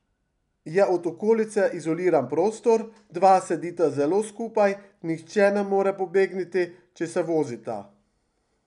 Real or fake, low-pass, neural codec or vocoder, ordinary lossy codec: real; 10.8 kHz; none; none